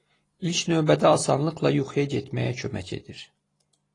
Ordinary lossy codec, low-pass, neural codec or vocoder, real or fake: AAC, 32 kbps; 10.8 kHz; none; real